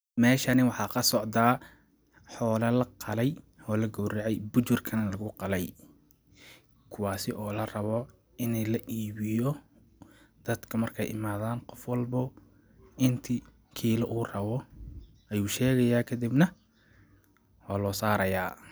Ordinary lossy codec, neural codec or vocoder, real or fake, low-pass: none; none; real; none